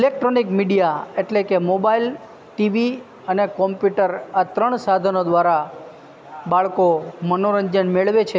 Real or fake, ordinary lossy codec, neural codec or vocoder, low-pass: real; none; none; none